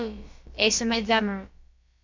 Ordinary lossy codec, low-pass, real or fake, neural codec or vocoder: MP3, 64 kbps; 7.2 kHz; fake; codec, 16 kHz, about 1 kbps, DyCAST, with the encoder's durations